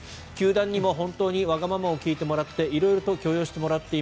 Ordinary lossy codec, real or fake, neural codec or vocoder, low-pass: none; real; none; none